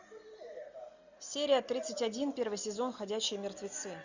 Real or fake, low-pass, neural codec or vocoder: real; 7.2 kHz; none